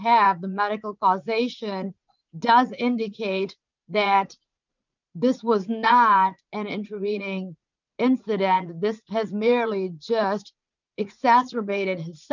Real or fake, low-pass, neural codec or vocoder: fake; 7.2 kHz; vocoder, 44.1 kHz, 80 mel bands, Vocos